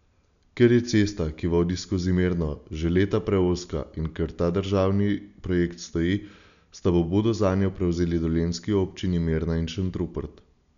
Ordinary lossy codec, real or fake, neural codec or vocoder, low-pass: none; real; none; 7.2 kHz